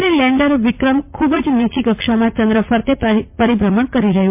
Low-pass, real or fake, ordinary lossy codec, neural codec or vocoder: 3.6 kHz; fake; MP3, 32 kbps; vocoder, 44.1 kHz, 80 mel bands, Vocos